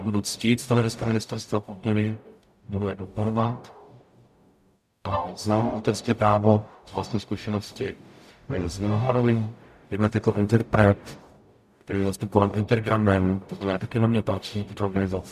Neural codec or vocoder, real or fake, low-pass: codec, 44.1 kHz, 0.9 kbps, DAC; fake; 14.4 kHz